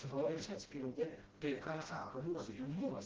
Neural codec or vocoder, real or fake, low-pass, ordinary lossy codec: codec, 16 kHz, 0.5 kbps, FreqCodec, smaller model; fake; 7.2 kHz; Opus, 16 kbps